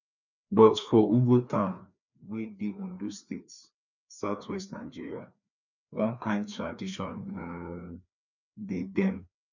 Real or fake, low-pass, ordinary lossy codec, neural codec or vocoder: fake; 7.2 kHz; none; codec, 16 kHz, 2 kbps, FreqCodec, larger model